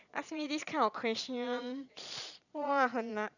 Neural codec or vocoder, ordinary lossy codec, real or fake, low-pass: vocoder, 22.05 kHz, 80 mel bands, Vocos; none; fake; 7.2 kHz